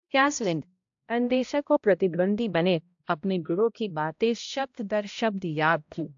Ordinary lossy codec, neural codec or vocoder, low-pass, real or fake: AAC, 64 kbps; codec, 16 kHz, 0.5 kbps, X-Codec, HuBERT features, trained on balanced general audio; 7.2 kHz; fake